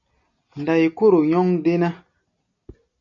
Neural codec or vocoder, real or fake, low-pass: none; real; 7.2 kHz